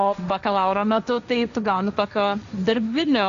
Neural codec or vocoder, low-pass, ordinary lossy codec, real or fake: codec, 16 kHz, 1.1 kbps, Voila-Tokenizer; 7.2 kHz; AAC, 96 kbps; fake